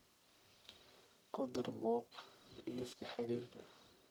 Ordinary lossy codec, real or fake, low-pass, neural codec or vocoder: none; fake; none; codec, 44.1 kHz, 1.7 kbps, Pupu-Codec